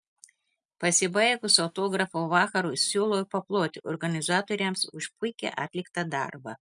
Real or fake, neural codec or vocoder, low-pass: real; none; 10.8 kHz